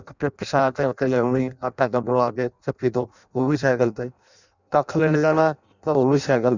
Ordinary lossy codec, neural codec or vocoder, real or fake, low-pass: none; codec, 16 kHz in and 24 kHz out, 0.6 kbps, FireRedTTS-2 codec; fake; 7.2 kHz